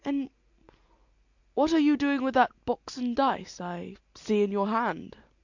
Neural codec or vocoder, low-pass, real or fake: none; 7.2 kHz; real